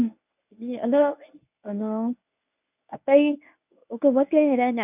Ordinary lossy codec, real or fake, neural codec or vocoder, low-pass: none; fake; codec, 24 kHz, 0.9 kbps, WavTokenizer, medium speech release version 1; 3.6 kHz